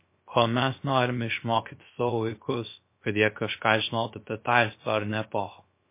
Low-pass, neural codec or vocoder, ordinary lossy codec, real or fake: 3.6 kHz; codec, 16 kHz, 0.3 kbps, FocalCodec; MP3, 24 kbps; fake